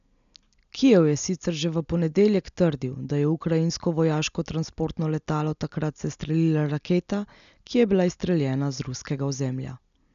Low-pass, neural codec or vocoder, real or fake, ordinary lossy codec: 7.2 kHz; none; real; none